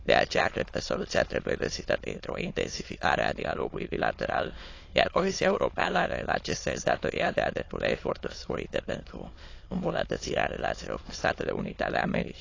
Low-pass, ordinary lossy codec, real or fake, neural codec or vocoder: 7.2 kHz; AAC, 32 kbps; fake; autoencoder, 22.05 kHz, a latent of 192 numbers a frame, VITS, trained on many speakers